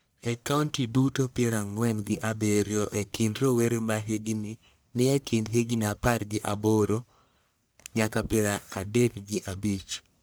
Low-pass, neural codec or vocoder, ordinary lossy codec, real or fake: none; codec, 44.1 kHz, 1.7 kbps, Pupu-Codec; none; fake